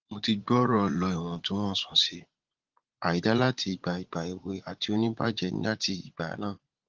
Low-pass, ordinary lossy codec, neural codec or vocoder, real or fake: 7.2 kHz; Opus, 16 kbps; vocoder, 44.1 kHz, 80 mel bands, Vocos; fake